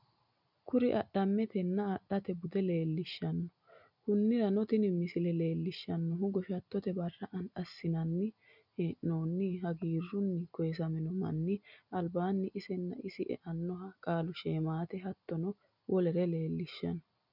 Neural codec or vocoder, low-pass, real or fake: none; 5.4 kHz; real